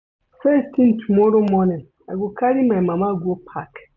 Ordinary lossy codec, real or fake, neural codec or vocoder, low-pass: none; real; none; 7.2 kHz